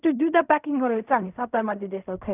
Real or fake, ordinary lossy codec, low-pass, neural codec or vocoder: fake; none; 3.6 kHz; codec, 16 kHz in and 24 kHz out, 0.4 kbps, LongCat-Audio-Codec, fine tuned four codebook decoder